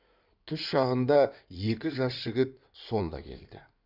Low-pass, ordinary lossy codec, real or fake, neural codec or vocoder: 5.4 kHz; none; fake; codec, 16 kHz in and 24 kHz out, 2.2 kbps, FireRedTTS-2 codec